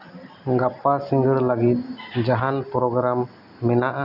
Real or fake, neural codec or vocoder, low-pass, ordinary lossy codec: real; none; 5.4 kHz; none